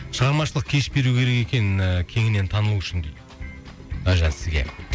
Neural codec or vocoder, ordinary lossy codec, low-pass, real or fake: none; none; none; real